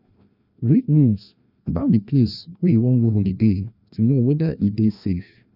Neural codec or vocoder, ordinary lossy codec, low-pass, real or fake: codec, 16 kHz, 1 kbps, FreqCodec, larger model; none; 5.4 kHz; fake